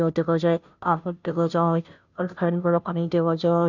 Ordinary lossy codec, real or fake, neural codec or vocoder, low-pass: none; fake; codec, 16 kHz, 0.5 kbps, FunCodec, trained on Chinese and English, 25 frames a second; 7.2 kHz